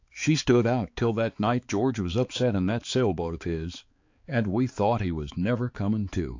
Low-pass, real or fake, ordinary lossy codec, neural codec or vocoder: 7.2 kHz; fake; AAC, 48 kbps; codec, 16 kHz, 4 kbps, X-Codec, HuBERT features, trained on balanced general audio